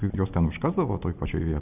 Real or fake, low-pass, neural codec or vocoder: real; 3.6 kHz; none